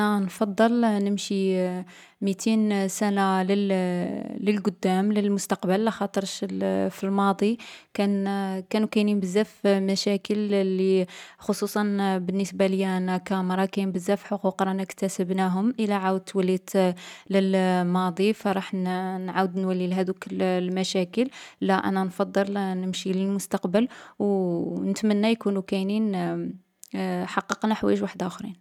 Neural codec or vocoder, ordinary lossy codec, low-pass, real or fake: none; none; 19.8 kHz; real